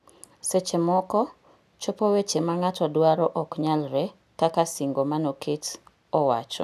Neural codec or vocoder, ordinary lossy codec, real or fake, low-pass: none; none; real; 14.4 kHz